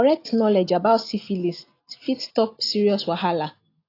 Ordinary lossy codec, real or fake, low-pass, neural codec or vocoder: AAC, 32 kbps; real; 5.4 kHz; none